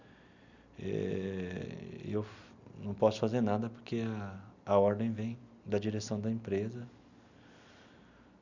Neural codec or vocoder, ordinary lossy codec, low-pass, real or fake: none; none; 7.2 kHz; real